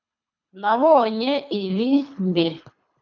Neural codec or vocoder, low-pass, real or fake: codec, 24 kHz, 3 kbps, HILCodec; 7.2 kHz; fake